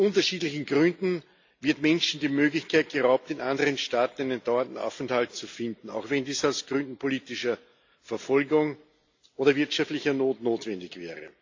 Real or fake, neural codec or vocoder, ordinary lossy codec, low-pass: real; none; AAC, 48 kbps; 7.2 kHz